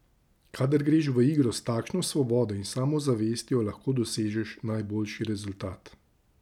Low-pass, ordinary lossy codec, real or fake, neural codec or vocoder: 19.8 kHz; none; real; none